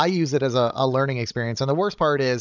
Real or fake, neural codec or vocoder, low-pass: fake; codec, 16 kHz, 16 kbps, FreqCodec, larger model; 7.2 kHz